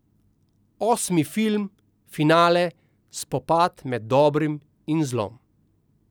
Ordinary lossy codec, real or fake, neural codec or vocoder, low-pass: none; real; none; none